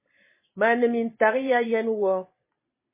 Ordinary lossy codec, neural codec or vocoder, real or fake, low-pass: MP3, 16 kbps; vocoder, 44.1 kHz, 128 mel bands every 512 samples, BigVGAN v2; fake; 3.6 kHz